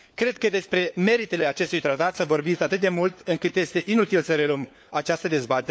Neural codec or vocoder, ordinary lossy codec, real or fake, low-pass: codec, 16 kHz, 4 kbps, FunCodec, trained on LibriTTS, 50 frames a second; none; fake; none